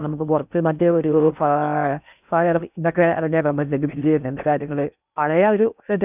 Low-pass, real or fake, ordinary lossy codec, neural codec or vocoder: 3.6 kHz; fake; none; codec, 16 kHz in and 24 kHz out, 0.6 kbps, FocalCodec, streaming, 4096 codes